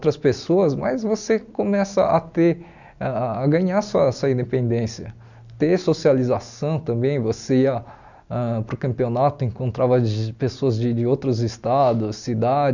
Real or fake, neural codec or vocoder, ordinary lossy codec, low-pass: real; none; none; 7.2 kHz